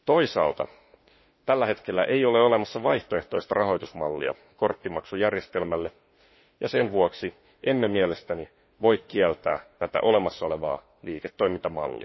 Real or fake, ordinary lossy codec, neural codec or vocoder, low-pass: fake; MP3, 24 kbps; autoencoder, 48 kHz, 32 numbers a frame, DAC-VAE, trained on Japanese speech; 7.2 kHz